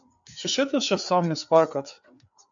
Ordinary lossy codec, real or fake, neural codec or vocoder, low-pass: MP3, 64 kbps; fake; codec, 16 kHz, 4 kbps, FreqCodec, larger model; 7.2 kHz